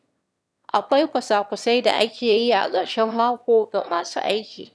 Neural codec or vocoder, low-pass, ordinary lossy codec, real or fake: autoencoder, 22.05 kHz, a latent of 192 numbers a frame, VITS, trained on one speaker; none; none; fake